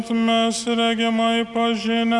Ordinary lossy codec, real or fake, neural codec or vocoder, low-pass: MP3, 96 kbps; real; none; 10.8 kHz